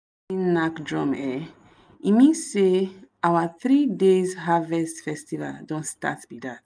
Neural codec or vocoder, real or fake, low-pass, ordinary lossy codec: none; real; none; none